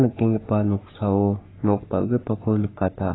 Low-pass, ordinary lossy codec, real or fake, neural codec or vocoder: 7.2 kHz; AAC, 16 kbps; fake; codec, 44.1 kHz, 3.4 kbps, Pupu-Codec